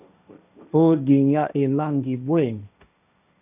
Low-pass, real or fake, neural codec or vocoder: 3.6 kHz; fake; codec, 16 kHz, 1.1 kbps, Voila-Tokenizer